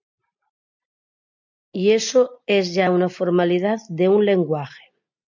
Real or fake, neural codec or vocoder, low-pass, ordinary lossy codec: real; none; 7.2 kHz; MP3, 64 kbps